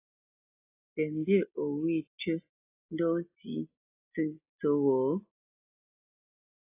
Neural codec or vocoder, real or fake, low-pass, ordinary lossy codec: none; real; 3.6 kHz; AAC, 32 kbps